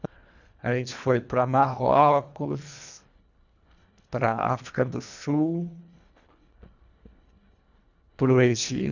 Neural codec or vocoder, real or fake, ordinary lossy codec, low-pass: codec, 24 kHz, 1.5 kbps, HILCodec; fake; none; 7.2 kHz